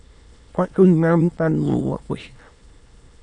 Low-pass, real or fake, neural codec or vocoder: 9.9 kHz; fake; autoencoder, 22.05 kHz, a latent of 192 numbers a frame, VITS, trained on many speakers